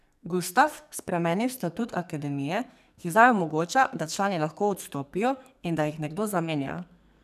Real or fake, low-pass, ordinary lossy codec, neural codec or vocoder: fake; 14.4 kHz; none; codec, 44.1 kHz, 2.6 kbps, SNAC